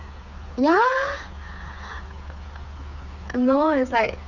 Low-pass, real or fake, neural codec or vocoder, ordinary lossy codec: 7.2 kHz; fake; codec, 16 kHz, 4 kbps, FreqCodec, smaller model; none